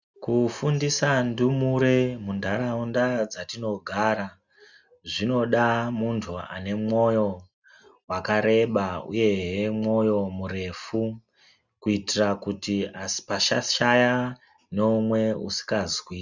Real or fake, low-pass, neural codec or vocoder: real; 7.2 kHz; none